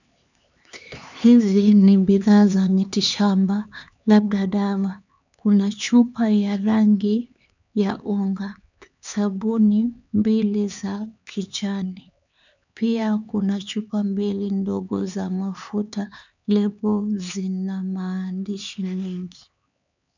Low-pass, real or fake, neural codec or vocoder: 7.2 kHz; fake; codec, 16 kHz, 4 kbps, X-Codec, HuBERT features, trained on LibriSpeech